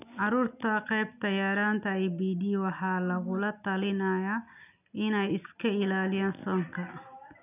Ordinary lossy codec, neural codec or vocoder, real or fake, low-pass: none; none; real; 3.6 kHz